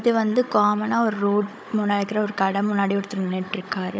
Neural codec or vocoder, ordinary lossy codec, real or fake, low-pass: codec, 16 kHz, 16 kbps, FunCodec, trained on Chinese and English, 50 frames a second; none; fake; none